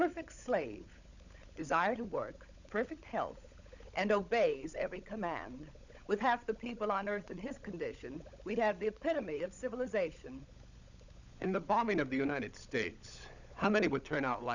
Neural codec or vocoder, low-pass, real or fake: codec, 16 kHz, 16 kbps, FunCodec, trained on LibriTTS, 50 frames a second; 7.2 kHz; fake